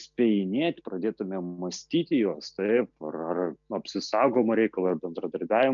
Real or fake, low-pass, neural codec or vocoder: real; 7.2 kHz; none